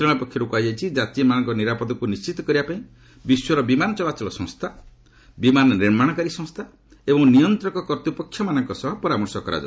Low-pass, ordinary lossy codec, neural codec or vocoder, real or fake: none; none; none; real